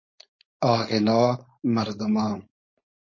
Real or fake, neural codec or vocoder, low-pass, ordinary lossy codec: fake; codec, 16 kHz, 4.8 kbps, FACodec; 7.2 kHz; MP3, 32 kbps